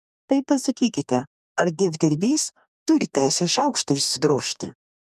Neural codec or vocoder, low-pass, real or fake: codec, 44.1 kHz, 2.6 kbps, DAC; 14.4 kHz; fake